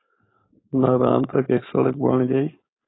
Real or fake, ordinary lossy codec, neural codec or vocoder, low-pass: fake; AAC, 16 kbps; codec, 16 kHz, 4.8 kbps, FACodec; 7.2 kHz